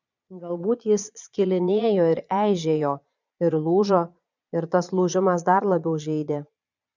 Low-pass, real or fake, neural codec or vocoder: 7.2 kHz; fake; vocoder, 22.05 kHz, 80 mel bands, Vocos